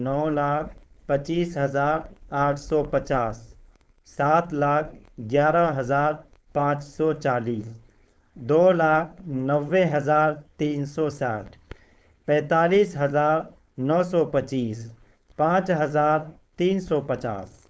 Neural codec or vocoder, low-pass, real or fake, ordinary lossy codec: codec, 16 kHz, 4.8 kbps, FACodec; none; fake; none